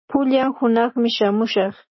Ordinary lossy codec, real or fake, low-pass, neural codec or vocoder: MP3, 24 kbps; real; 7.2 kHz; none